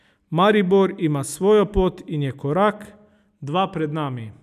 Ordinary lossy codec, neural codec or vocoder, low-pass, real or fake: none; none; 14.4 kHz; real